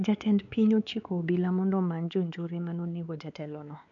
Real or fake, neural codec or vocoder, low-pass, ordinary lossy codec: fake; codec, 16 kHz, 4 kbps, X-Codec, WavLM features, trained on Multilingual LibriSpeech; 7.2 kHz; none